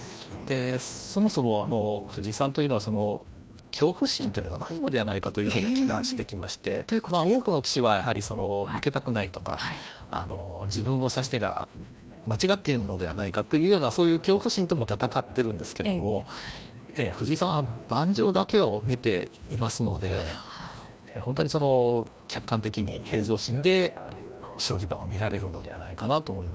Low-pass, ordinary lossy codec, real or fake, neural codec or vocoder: none; none; fake; codec, 16 kHz, 1 kbps, FreqCodec, larger model